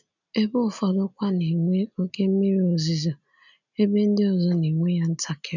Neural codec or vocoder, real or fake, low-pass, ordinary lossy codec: none; real; 7.2 kHz; none